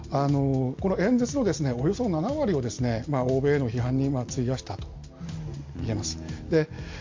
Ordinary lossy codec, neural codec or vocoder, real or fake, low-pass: MP3, 48 kbps; none; real; 7.2 kHz